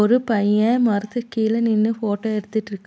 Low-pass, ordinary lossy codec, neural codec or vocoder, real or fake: none; none; none; real